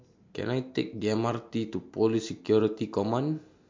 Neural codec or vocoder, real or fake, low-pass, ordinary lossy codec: none; real; 7.2 kHz; MP3, 48 kbps